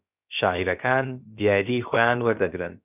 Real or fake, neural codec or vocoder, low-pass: fake; codec, 16 kHz, about 1 kbps, DyCAST, with the encoder's durations; 3.6 kHz